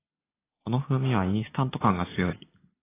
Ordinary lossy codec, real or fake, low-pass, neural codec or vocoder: AAC, 16 kbps; real; 3.6 kHz; none